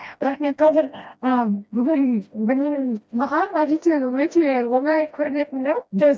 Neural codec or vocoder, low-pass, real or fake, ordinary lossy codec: codec, 16 kHz, 1 kbps, FreqCodec, smaller model; none; fake; none